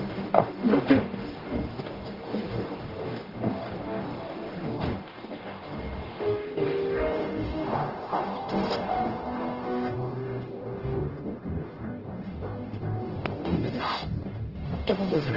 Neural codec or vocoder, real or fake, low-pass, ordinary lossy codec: codec, 44.1 kHz, 0.9 kbps, DAC; fake; 5.4 kHz; Opus, 16 kbps